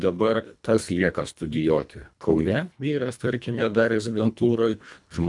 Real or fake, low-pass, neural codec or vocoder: fake; 10.8 kHz; codec, 24 kHz, 1.5 kbps, HILCodec